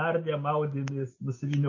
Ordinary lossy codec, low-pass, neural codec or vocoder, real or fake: MP3, 32 kbps; 7.2 kHz; none; real